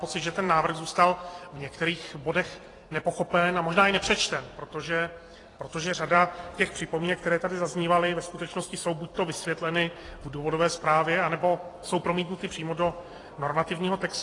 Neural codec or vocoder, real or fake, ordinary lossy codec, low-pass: vocoder, 44.1 kHz, 128 mel bands every 256 samples, BigVGAN v2; fake; AAC, 32 kbps; 10.8 kHz